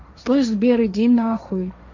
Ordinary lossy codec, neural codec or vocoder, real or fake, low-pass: none; codec, 16 kHz, 1.1 kbps, Voila-Tokenizer; fake; 7.2 kHz